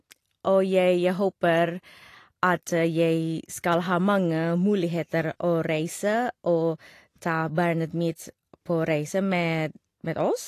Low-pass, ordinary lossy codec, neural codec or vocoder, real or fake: 14.4 kHz; AAC, 48 kbps; none; real